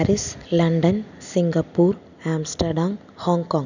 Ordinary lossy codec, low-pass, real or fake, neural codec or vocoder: none; 7.2 kHz; real; none